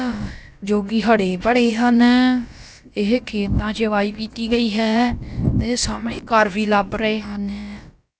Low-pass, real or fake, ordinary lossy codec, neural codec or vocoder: none; fake; none; codec, 16 kHz, about 1 kbps, DyCAST, with the encoder's durations